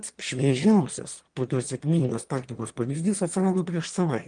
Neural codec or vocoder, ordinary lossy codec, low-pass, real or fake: autoencoder, 22.05 kHz, a latent of 192 numbers a frame, VITS, trained on one speaker; Opus, 24 kbps; 9.9 kHz; fake